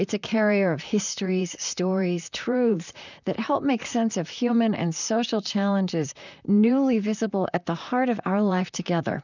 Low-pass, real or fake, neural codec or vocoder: 7.2 kHz; fake; vocoder, 44.1 kHz, 128 mel bands, Pupu-Vocoder